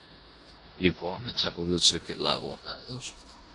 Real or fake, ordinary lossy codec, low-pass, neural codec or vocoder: fake; AAC, 48 kbps; 10.8 kHz; codec, 16 kHz in and 24 kHz out, 0.9 kbps, LongCat-Audio-Codec, four codebook decoder